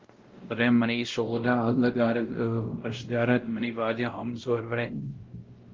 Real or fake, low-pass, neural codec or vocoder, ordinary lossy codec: fake; 7.2 kHz; codec, 16 kHz, 0.5 kbps, X-Codec, WavLM features, trained on Multilingual LibriSpeech; Opus, 16 kbps